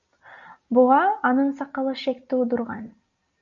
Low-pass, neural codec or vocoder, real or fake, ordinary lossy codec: 7.2 kHz; none; real; MP3, 96 kbps